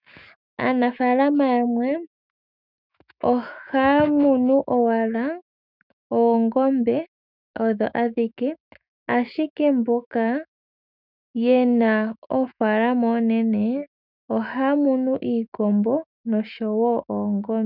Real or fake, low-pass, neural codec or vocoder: fake; 5.4 kHz; autoencoder, 48 kHz, 128 numbers a frame, DAC-VAE, trained on Japanese speech